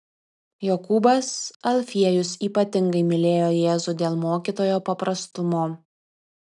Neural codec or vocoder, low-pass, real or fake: none; 10.8 kHz; real